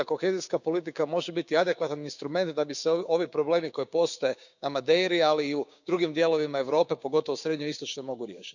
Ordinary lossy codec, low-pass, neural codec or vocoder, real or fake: none; 7.2 kHz; codec, 16 kHz, 6 kbps, DAC; fake